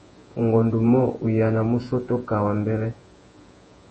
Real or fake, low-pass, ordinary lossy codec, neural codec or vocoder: fake; 10.8 kHz; MP3, 32 kbps; vocoder, 48 kHz, 128 mel bands, Vocos